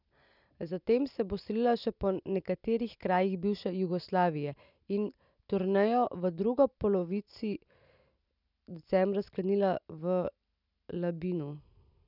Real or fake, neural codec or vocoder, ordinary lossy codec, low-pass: real; none; none; 5.4 kHz